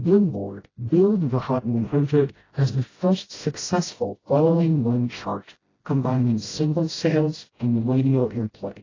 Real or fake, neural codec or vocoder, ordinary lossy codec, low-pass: fake; codec, 16 kHz, 0.5 kbps, FreqCodec, smaller model; AAC, 32 kbps; 7.2 kHz